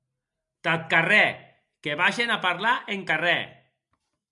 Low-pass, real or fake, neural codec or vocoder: 10.8 kHz; real; none